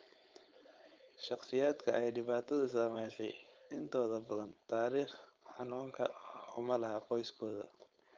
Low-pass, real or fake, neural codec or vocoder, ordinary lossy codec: 7.2 kHz; fake; codec, 16 kHz, 4.8 kbps, FACodec; Opus, 24 kbps